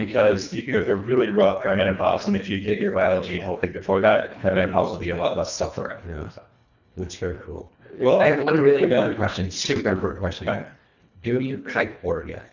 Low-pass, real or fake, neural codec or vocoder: 7.2 kHz; fake; codec, 24 kHz, 1.5 kbps, HILCodec